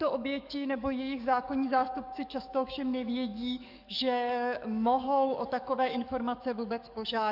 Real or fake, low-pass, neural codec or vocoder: fake; 5.4 kHz; codec, 44.1 kHz, 7.8 kbps, DAC